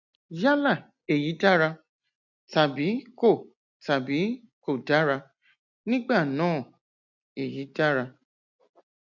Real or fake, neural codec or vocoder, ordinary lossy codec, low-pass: real; none; none; 7.2 kHz